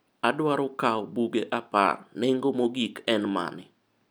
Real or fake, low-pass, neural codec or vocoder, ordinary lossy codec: real; none; none; none